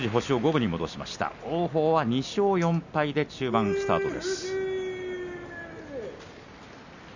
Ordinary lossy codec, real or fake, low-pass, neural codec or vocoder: none; real; 7.2 kHz; none